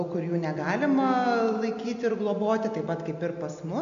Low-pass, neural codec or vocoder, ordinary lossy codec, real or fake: 7.2 kHz; none; AAC, 64 kbps; real